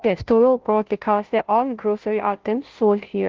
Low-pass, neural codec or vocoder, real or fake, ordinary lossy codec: 7.2 kHz; codec, 16 kHz, 0.5 kbps, FunCodec, trained on Chinese and English, 25 frames a second; fake; Opus, 16 kbps